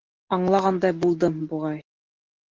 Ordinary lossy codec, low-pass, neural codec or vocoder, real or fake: Opus, 16 kbps; 7.2 kHz; none; real